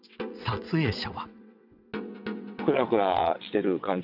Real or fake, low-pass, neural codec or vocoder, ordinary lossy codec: fake; 5.4 kHz; vocoder, 22.05 kHz, 80 mel bands, WaveNeXt; none